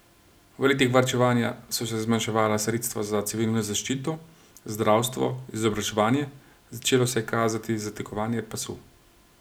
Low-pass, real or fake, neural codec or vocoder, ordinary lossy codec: none; real; none; none